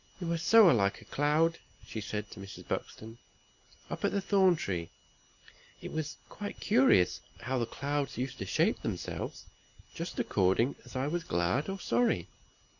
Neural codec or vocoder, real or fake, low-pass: none; real; 7.2 kHz